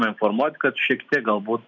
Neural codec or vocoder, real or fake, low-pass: none; real; 7.2 kHz